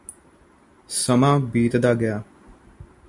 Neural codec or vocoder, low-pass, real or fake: none; 10.8 kHz; real